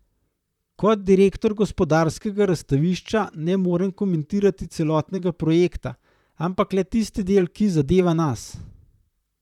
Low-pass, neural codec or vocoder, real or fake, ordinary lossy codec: 19.8 kHz; vocoder, 44.1 kHz, 128 mel bands, Pupu-Vocoder; fake; none